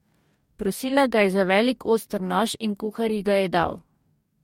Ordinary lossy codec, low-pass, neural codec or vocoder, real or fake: MP3, 64 kbps; 19.8 kHz; codec, 44.1 kHz, 2.6 kbps, DAC; fake